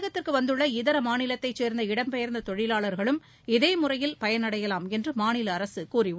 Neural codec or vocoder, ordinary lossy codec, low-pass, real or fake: none; none; none; real